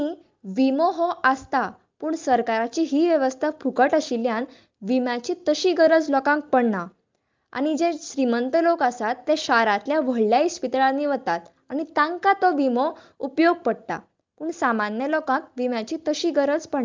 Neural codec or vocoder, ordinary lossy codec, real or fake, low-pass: none; Opus, 24 kbps; real; 7.2 kHz